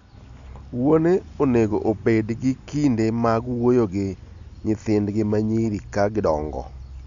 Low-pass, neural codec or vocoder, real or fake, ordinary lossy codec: 7.2 kHz; none; real; none